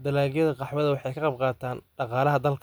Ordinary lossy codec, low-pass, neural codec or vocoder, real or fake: none; none; none; real